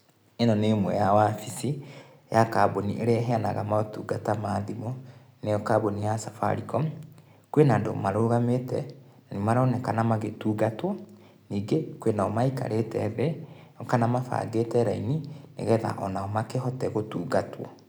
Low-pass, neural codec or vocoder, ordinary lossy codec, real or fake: none; none; none; real